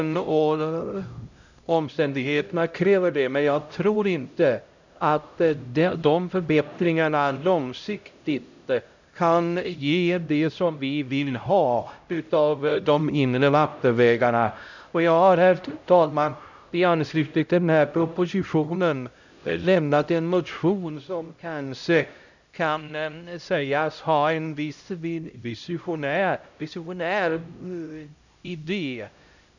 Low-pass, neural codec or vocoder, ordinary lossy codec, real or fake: 7.2 kHz; codec, 16 kHz, 0.5 kbps, X-Codec, HuBERT features, trained on LibriSpeech; none; fake